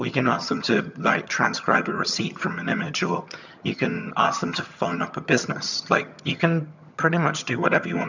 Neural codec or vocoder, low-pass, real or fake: vocoder, 22.05 kHz, 80 mel bands, HiFi-GAN; 7.2 kHz; fake